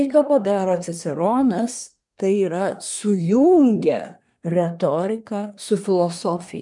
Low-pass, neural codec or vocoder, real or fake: 10.8 kHz; codec, 24 kHz, 1 kbps, SNAC; fake